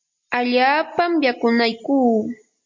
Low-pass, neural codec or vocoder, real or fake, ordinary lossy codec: 7.2 kHz; none; real; MP3, 48 kbps